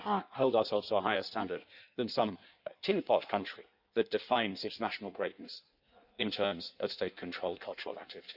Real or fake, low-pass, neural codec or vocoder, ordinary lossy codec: fake; 5.4 kHz; codec, 16 kHz in and 24 kHz out, 1.1 kbps, FireRedTTS-2 codec; Opus, 64 kbps